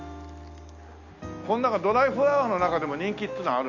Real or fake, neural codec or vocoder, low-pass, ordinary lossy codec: real; none; 7.2 kHz; none